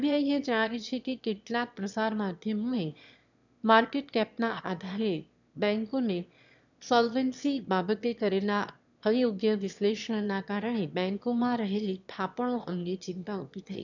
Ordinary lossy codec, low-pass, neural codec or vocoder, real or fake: none; 7.2 kHz; autoencoder, 22.05 kHz, a latent of 192 numbers a frame, VITS, trained on one speaker; fake